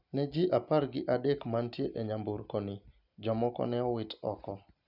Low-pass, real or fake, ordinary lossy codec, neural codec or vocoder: 5.4 kHz; real; none; none